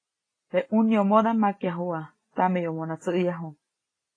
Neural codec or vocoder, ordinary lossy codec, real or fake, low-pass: none; AAC, 32 kbps; real; 9.9 kHz